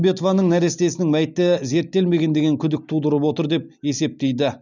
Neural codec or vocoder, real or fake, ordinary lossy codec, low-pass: none; real; none; 7.2 kHz